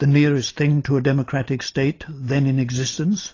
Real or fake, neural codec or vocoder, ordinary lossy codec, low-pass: real; none; AAC, 32 kbps; 7.2 kHz